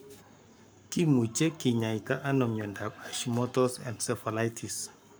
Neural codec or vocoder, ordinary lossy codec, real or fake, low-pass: codec, 44.1 kHz, 7.8 kbps, Pupu-Codec; none; fake; none